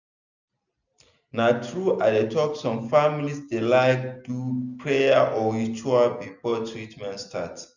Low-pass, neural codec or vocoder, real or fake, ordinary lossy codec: 7.2 kHz; none; real; none